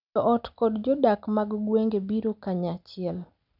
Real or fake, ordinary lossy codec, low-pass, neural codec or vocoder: real; none; 5.4 kHz; none